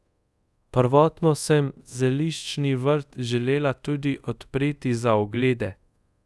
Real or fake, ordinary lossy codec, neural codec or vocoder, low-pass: fake; none; codec, 24 kHz, 0.5 kbps, DualCodec; none